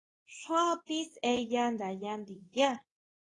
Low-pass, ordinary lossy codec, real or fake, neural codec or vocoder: 10.8 kHz; AAC, 32 kbps; fake; codec, 24 kHz, 0.9 kbps, WavTokenizer, medium speech release version 2